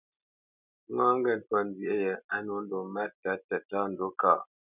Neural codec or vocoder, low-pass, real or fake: none; 3.6 kHz; real